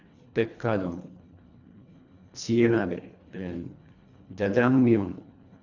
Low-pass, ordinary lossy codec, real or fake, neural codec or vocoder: 7.2 kHz; none; fake; codec, 24 kHz, 1.5 kbps, HILCodec